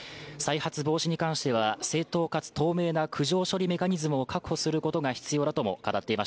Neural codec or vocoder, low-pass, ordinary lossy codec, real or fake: none; none; none; real